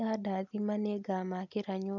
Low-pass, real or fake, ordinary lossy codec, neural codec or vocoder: 7.2 kHz; real; none; none